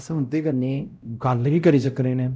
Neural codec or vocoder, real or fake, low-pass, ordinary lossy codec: codec, 16 kHz, 0.5 kbps, X-Codec, WavLM features, trained on Multilingual LibriSpeech; fake; none; none